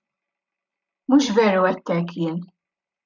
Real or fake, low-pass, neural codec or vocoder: real; 7.2 kHz; none